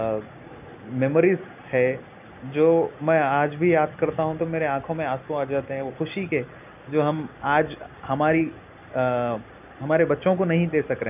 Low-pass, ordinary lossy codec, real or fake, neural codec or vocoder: 3.6 kHz; none; real; none